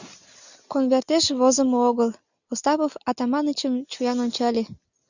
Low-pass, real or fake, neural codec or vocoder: 7.2 kHz; real; none